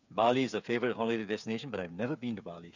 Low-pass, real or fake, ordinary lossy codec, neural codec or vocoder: 7.2 kHz; fake; none; codec, 16 kHz, 8 kbps, FreqCodec, smaller model